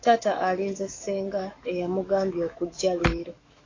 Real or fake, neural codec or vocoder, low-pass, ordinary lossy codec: fake; autoencoder, 48 kHz, 128 numbers a frame, DAC-VAE, trained on Japanese speech; 7.2 kHz; AAC, 32 kbps